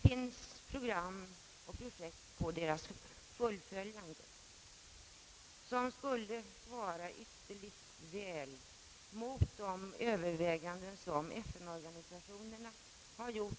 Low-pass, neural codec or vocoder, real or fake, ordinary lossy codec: none; none; real; none